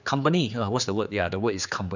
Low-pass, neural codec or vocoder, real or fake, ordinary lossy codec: 7.2 kHz; codec, 16 kHz, 4 kbps, X-Codec, HuBERT features, trained on general audio; fake; none